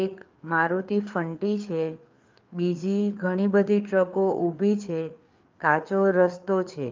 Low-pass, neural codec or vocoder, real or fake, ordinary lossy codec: 7.2 kHz; codec, 16 kHz, 4 kbps, FreqCodec, larger model; fake; Opus, 24 kbps